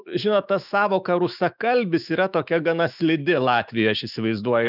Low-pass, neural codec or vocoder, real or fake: 5.4 kHz; autoencoder, 48 kHz, 128 numbers a frame, DAC-VAE, trained on Japanese speech; fake